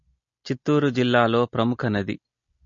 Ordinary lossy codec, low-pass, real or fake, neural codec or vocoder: MP3, 32 kbps; 7.2 kHz; real; none